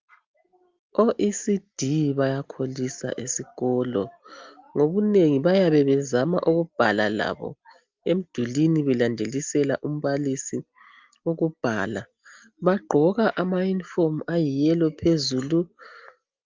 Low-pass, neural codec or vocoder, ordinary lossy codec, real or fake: 7.2 kHz; none; Opus, 24 kbps; real